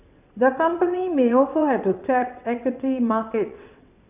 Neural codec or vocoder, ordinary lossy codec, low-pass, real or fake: vocoder, 22.05 kHz, 80 mel bands, WaveNeXt; none; 3.6 kHz; fake